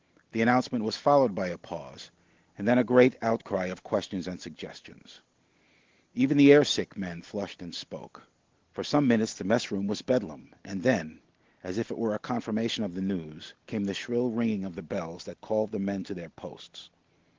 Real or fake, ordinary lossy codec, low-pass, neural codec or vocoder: real; Opus, 16 kbps; 7.2 kHz; none